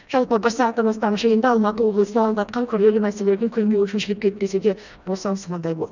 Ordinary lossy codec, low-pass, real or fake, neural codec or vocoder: none; 7.2 kHz; fake; codec, 16 kHz, 1 kbps, FreqCodec, smaller model